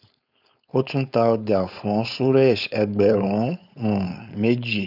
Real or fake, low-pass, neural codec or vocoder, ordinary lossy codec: fake; 5.4 kHz; codec, 16 kHz, 4.8 kbps, FACodec; none